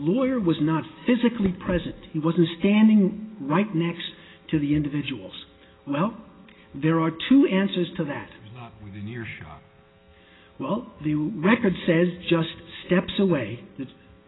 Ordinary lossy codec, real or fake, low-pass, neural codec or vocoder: AAC, 16 kbps; real; 7.2 kHz; none